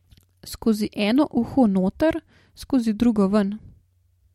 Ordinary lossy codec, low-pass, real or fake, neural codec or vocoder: MP3, 64 kbps; 19.8 kHz; real; none